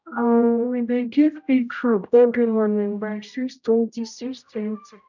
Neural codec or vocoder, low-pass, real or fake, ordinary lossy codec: codec, 16 kHz, 0.5 kbps, X-Codec, HuBERT features, trained on general audio; 7.2 kHz; fake; none